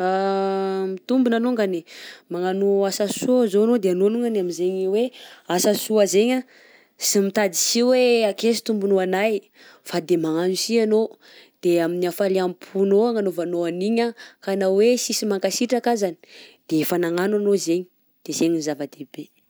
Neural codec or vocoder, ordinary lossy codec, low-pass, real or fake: none; none; none; real